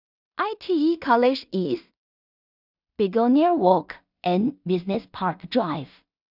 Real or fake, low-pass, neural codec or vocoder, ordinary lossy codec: fake; 5.4 kHz; codec, 16 kHz in and 24 kHz out, 0.4 kbps, LongCat-Audio-Codec, two codebook decoder; none